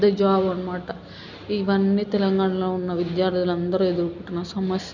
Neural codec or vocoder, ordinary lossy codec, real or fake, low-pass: none; none; real; 7.2 kHz